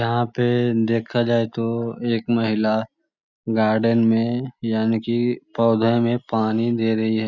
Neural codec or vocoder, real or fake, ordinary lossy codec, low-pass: none; real; none; 7.2 kHz